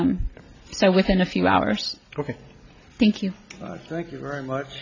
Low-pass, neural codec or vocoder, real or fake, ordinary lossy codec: 7.2 kHz; none; real; AAC, 48 kbps